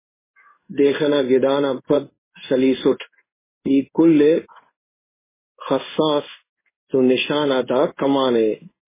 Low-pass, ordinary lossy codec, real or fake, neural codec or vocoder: 3.6 kHz; MP3, 16 kbps; fake; codec, 16 kHz in and 24 kHz out, 1 kbps, XY-Tokenizer